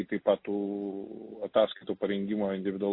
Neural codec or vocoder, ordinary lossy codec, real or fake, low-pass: none; MP3, 24 kbps; real; 5.4 kHz